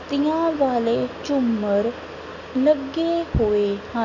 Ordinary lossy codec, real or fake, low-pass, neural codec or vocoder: none; real; 7.2 kHz; none